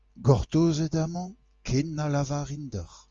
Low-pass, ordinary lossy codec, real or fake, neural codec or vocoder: 7.2 kHz; Opus, 32 kbps; real; none